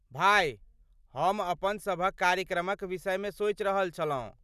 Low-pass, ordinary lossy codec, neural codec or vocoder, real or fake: 14.4 kHz; none; none; real